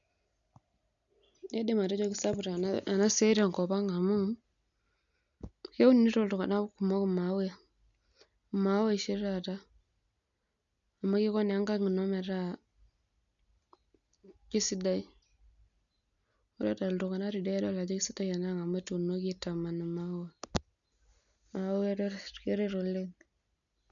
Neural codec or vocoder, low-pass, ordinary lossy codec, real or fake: none; 7.2 kHz; none; real